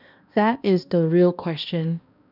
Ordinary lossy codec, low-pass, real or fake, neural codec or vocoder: none; 5.4 kHz; fake; codec, 16 kHz, 1 kbps, X-Codec, HuBERT features, trained on balanced general audio